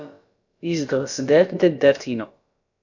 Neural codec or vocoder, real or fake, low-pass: codec, 16 kHz, about 1 kbps, DyCAST, with the encoder's durations; fake; 7.2 kHz